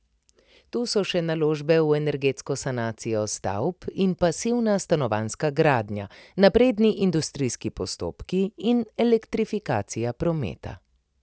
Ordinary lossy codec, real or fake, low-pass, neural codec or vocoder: none; real; none; none